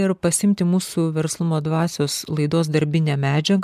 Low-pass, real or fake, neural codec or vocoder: 14.4 kHz; real; none